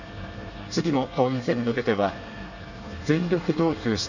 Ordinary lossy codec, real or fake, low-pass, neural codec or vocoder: none; fake; 7.2 kHz; codec, 24 kHz, 1 kbps, SNAC